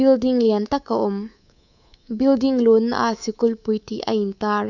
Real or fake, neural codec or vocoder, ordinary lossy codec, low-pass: fake; codec, 24 kHz, 3.1 kbps, DualCodec; none; 7.2 kHz